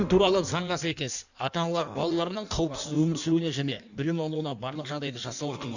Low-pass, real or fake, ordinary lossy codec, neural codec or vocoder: 7.2 kHz; fake; none; codec, 16 kHz in and 24 kHz out, 1.1 kbps, FireRedTTS-2 codec